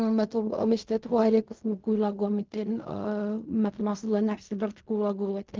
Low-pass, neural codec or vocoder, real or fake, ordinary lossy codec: 7.2 kHz; codec, 16 kHz in and 24 kHz out, 0.4 kbps, LongCat-Audio-Codec, fine tuned four codebook decoder; fake; Opus, 32 kbps